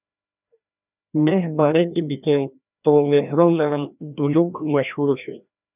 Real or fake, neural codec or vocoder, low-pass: fake; codec, 16 kHz, 1 kbps, FreqCodec, larger model; 3.6 kHz